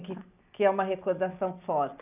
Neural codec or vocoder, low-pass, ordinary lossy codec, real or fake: none; 3.6 kHz; none; real